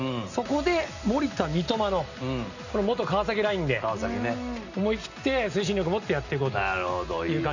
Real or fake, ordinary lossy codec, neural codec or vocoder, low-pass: real; none; none; 7.2 kHz